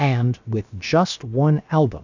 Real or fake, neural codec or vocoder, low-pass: fake; codec, 16 kHz, about 1 kbps, DyCAST, with the encoder's durations; 7.2 kHz